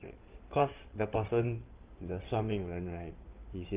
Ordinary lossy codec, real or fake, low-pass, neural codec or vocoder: Opus, 32 kbps; fake; 3.6 kHz; codec, 16 kHz in and 24 kHz out, 2.2 kbps, FireRedTTS-2 codec